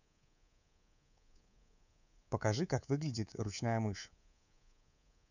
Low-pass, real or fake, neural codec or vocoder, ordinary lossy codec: 7.2 kHz; fake; codec, 24 kHz, 3.1 kbps, DualCodec; none